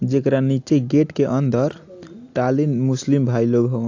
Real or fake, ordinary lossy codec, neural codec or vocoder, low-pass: real; AAC, 48 kbps; none; 7.2 kHz